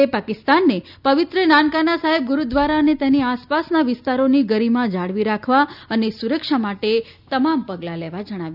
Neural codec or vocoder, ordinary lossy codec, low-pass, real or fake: none; none; 5.4 kHz; real